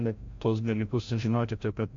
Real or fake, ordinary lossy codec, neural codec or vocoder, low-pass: fake; MP3, 48 kbps; codec, 16 kHz, 0.5 kbps, FreqCodec, larger model; 7.2 kHz